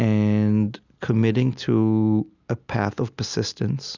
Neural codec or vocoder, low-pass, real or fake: none; 7.2 kHz; real